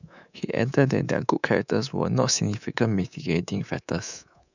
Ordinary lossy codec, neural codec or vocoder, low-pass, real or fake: none; codec, 24 kHz, 3.1 kbps, DualCodec; 7.2 kHz; fake